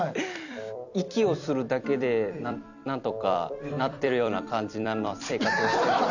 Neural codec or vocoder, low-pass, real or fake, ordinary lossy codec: vocoder, 44.1 kHz, 128 mel bands every 256 samples, BigVGAN v2; 7.2 kHz; fake; none